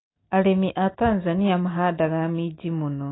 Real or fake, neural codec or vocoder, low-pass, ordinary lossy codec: real; none; 7.2 kHz; AAC, 16 kbps